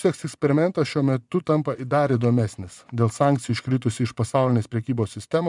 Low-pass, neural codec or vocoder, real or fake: 10.8 kHz; vocoder, 24 kHz, 100 mel bands, Vocos; fake